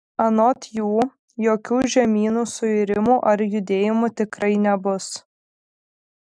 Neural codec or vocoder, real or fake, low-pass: none; real; 9.9 kHz